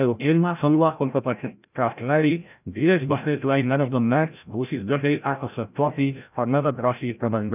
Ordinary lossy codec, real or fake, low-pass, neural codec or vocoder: none; fake; 3.6 kHz; codec, 16 kHz, 0.5 kbps, FreqCodec, larger model